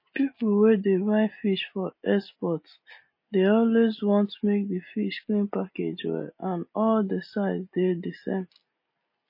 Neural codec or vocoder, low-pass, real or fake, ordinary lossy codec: none; 5.4 kHz; real; MP3, 24 kbps